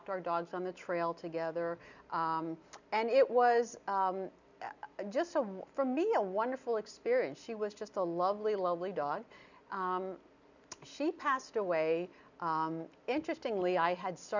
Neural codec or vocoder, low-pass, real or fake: none; 7.2 kHz; real